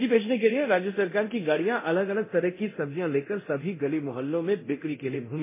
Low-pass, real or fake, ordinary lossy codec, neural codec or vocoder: 3.6 kHz; fake; MP3, 16 kbps; codec, 24 kHz, 0.9 kbps, DualCodec